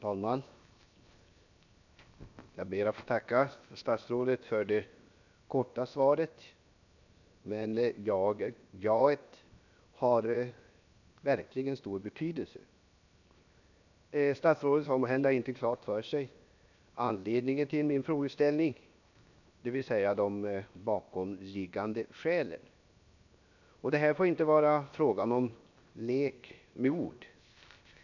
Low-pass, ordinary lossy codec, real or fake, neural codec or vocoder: 7.2 kHz; none; fake; codec, 16 kHz, 0.7 kbps, FocalCodec